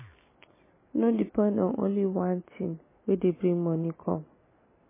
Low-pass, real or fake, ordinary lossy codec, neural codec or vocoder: 3.6 kHz; real; MP3, 16 kbps; none